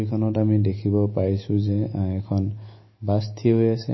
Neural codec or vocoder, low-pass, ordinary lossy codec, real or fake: none; 7.2 kHz; MP3, 24 kbps; real